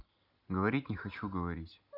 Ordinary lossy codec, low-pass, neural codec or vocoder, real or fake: none; 5.4 kHz; none; real